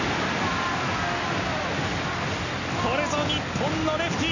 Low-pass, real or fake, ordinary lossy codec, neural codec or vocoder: 7.2 kHz; real; none; none